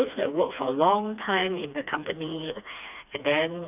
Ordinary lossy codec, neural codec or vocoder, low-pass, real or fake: none; codec, 16 kHz, 2 kbps, FreqCodec, smaller model; 3.6 kHz; fake